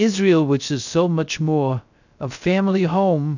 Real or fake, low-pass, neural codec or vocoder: fake; 7.2 kHz; codec, 16 kHz, 0.3 kbps, FocalCodec